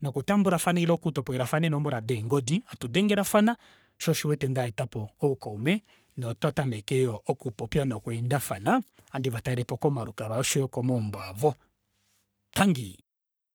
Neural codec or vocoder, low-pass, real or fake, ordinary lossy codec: codec, 44.1 kHz, 3.4 kbps, Pupu-Codec; none; fake; none